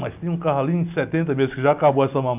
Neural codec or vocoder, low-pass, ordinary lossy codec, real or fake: none; 3.6 kHz; AAC, 32 kbps; real